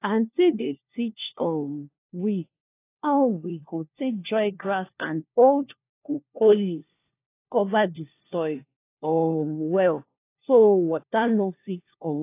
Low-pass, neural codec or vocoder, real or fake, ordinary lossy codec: 3.6 kHz; codec, 16 kHz, 1 kbps, FunCodec, trained on LibriTTS, 50 frames a second; fake; AAC, 24 kbps